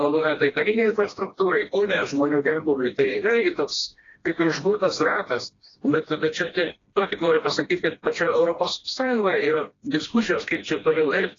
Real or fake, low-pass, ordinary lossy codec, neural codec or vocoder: fake; 7.2 kHz; AAC, 32 kbps; codec, 16 kHz, 1 kbps, FreqCodec, smaller model